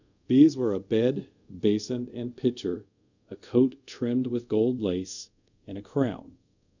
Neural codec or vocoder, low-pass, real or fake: codec, 24 kHz, 0.5 kbps, DualCodec; 7.2 kHz; fake